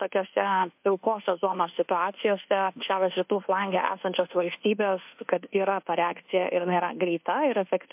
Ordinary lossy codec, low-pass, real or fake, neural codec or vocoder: MP3, 32 kbps; 3.6 kHz; fake; codec, 24 kHz, 1.2 kbps, DualCodec